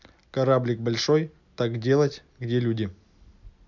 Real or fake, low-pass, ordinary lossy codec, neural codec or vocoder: real; 7.2 kHz; MP3, 64 kbps; none